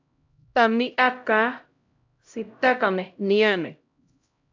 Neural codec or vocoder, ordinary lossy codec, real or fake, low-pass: codec, 16 kHz, 0.5 kbps, X-Codec, HuBERT features, trained on LibriSpeech; AAC, 48 kbps; fake; 7.2 kHz